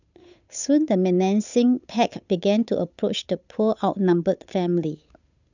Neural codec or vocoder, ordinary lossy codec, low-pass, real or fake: codec, 16 kHz, 8 kbps, FunCodec, trained on Chinese and English, 25 frames a second; none; 7.2 kHz; fake